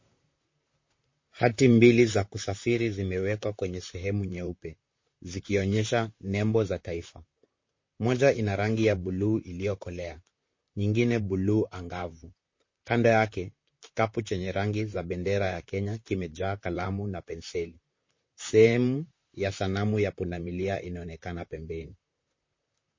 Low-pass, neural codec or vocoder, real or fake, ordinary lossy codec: 7.2 kHz; vocoder, 44.1 kHz, 128 mel bands, Pupu-Vocoder; fake; MP3, 32 kbps